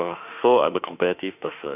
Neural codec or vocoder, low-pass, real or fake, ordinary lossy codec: autoencoder, 48 kHz, 32 numbers a frame, DAC-VAE, trained on Japanese speech; 3.6 kHz; fake; none